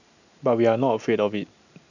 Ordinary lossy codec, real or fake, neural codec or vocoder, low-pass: none; real; none; 7.2 kHz